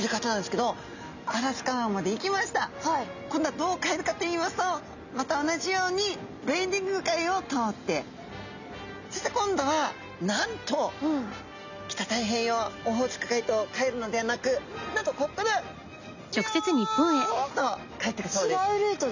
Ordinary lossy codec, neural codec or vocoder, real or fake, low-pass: none; none; real; 7.2 kHz